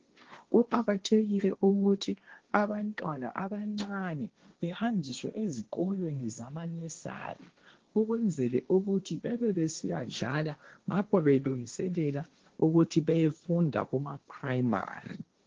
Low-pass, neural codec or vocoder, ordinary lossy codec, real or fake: 7.2 kHz; codec, 16 kHz, 1.1 kbps, Voila-Tokenizer; Opus, 16 kbps; fake